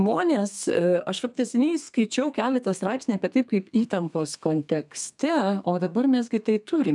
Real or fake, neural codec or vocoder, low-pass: fake; codec, 32 kHz, 1.9 kbps, SNAC; 10.8 kHz